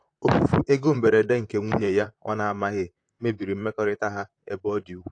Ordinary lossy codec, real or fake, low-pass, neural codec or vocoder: AAC, 48 kbps; fake; 9.9 kHz; vocoder, 44.1 kHz, 128 mel bands, Pupu-Vocoder